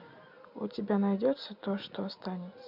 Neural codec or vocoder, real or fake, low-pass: none; real; 5.4 kHz